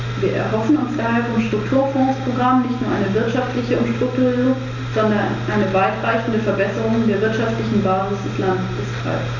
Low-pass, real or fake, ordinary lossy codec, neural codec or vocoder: 7.2 kHz; real; none; none